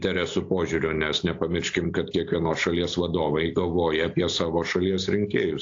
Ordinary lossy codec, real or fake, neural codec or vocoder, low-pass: AAC, 48 kbps; real; none; 7.2 kHz